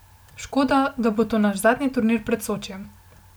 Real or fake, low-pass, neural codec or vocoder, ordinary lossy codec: real; none; none; none